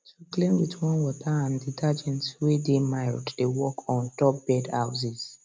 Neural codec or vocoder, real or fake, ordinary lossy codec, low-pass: none; real; none; none